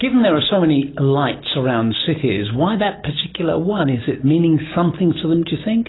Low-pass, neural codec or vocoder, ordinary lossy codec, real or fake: 7.2 kHz; none; AAC, 16 kbps; real